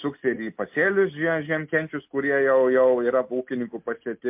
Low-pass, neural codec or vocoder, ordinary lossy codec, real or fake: 3.6 kHz; none; MP3, 32 kbps; real